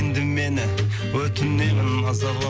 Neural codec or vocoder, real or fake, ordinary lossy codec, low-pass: none; real; none; none